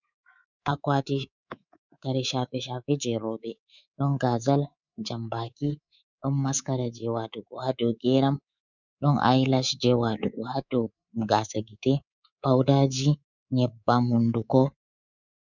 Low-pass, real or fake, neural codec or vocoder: 7.2 kHz; fake; codec, 24 kHz, 3.1 kbps, DualCodec